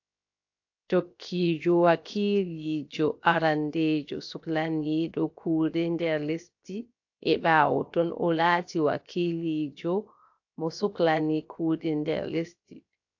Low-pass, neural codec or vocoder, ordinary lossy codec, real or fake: 7.2 kHz; codec, 16 kHz, 0.7 kbps, FocalCodec; AAC, 48 kbps; fake